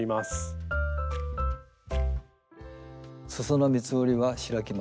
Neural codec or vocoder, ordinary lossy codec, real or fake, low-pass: none; none; real; none